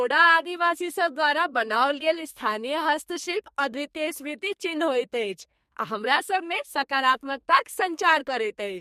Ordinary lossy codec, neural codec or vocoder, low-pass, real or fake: MP3, 64 kbps; codec, 32 kHz, 1.9 kbps, SNAC; 14.4 kHz; fake